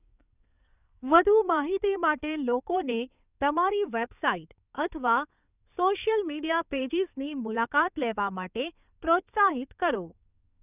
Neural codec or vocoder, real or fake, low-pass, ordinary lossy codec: codec, 16 kHz in and 24 kHz out, 2.2 kbps, FireRedTTS-2 codec; fake; 3.6 kHz; none